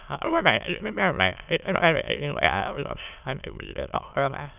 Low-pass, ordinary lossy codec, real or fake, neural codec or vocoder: 3.6 kHz; none; fake; autoencoder, 22.05 kHz, a latent of 192 numbers a frame, VITS, trained on many speakers